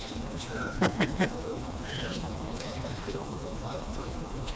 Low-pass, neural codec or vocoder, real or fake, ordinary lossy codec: none; codec, 16 kHz, 2 kbps, FreqCodec, smaller model; fake; none